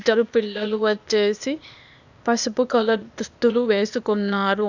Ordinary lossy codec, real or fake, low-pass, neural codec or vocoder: none; fake; 7.2 kHz; codec, 16 kHz, 0.8 kbps, ZipCodec